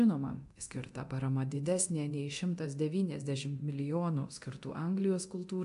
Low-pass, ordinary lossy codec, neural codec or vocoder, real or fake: 10.8 kHz; AAC, 64 kbps; codec, 24 kHz, 0.9 kbps, DualCodec; fake